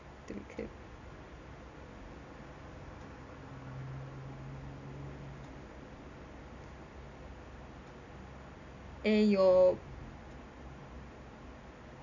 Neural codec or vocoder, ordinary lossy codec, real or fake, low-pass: none; none; real; 7.2 kHz